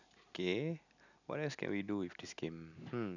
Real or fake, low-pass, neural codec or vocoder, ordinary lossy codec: real; 7.2 kHz; none; none